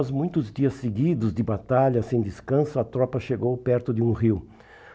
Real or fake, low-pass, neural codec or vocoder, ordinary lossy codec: real; none; none; none